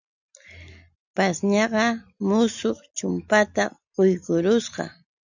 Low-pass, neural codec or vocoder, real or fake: 7.2 kHz; none; real